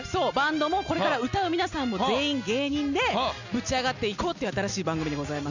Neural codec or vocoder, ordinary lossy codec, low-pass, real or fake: none; none; 7.2 kHz; real